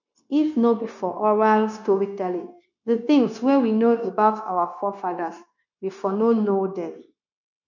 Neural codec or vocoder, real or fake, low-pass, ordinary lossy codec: codec, 16 kHz, 0.9 kbps, LongCat-Audio-Codec; fake; 7.2 kHz; none